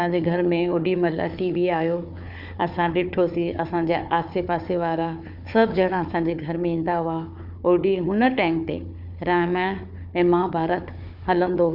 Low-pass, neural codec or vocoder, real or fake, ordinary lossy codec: 5.4 kHz; codec, 16 kHz, 4 kbps, FunCodec, trained on Chinese and English, 50 frames a second; fake; Opus, 64 kbps